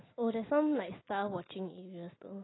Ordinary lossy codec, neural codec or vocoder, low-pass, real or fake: AAC, 16 kbps; none; 7.2 kHz; real